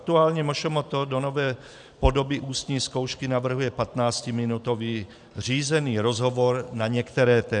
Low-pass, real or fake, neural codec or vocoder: 10.8 kHz; fake; vocoder, 44.1 kHz, 128 mel bands every 512 samples, BigVGAN v2